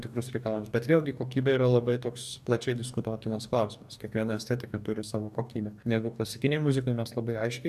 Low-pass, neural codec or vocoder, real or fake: 14.4 kHz; codec, 44.1 kHz, 2.6 kbps, DAC; fake